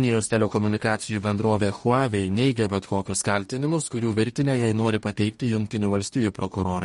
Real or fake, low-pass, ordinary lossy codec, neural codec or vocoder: fake; 19.8 kHz; MP3, 48 kbps; codec, 44.1 kHz, 2.6 kbps, DAC